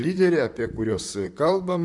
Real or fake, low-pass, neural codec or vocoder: fake; 10.8 kHz; vocoder, 44.1 kHz, 128 mel bands, Pupu-Vocoder